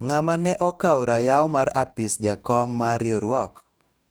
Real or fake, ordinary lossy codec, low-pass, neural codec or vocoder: fake; none; none; codec, 44.1 kHz, 2.6 kbps, DAC